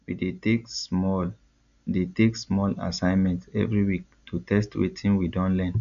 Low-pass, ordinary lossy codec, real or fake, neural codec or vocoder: 7.2 kHz; none; real; none